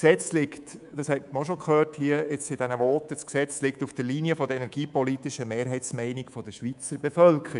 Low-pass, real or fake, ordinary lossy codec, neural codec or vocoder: 10.8 kHz; fake; none; codec, 24 kHz, 3.1 kbps, DualCodec